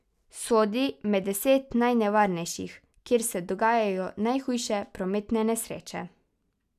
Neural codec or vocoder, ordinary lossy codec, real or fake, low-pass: none; none; real; 14.4 kHz